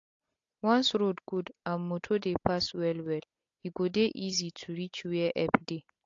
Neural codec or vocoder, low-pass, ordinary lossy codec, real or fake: none; 7.2 kHz; AAC, 48 kbps; real